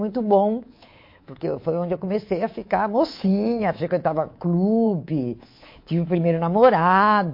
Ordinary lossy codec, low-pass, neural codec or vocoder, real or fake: MP3, 32 kbps; 5.4 kHz; codec, 24 kHz, 3.1 kbps, DualCodec; fake